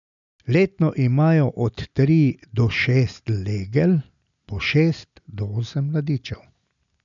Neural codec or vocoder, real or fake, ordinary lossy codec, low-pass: none; real; MP3, 96 kbps; 7.2 kHz